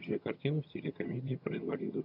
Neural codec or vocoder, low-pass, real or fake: vocoder, 22.05 kHz, 80 mel bands, HiFi-GAN; 5.4 kHz; fake